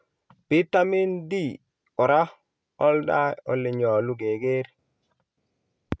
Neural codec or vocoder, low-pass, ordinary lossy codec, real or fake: none; none; none; real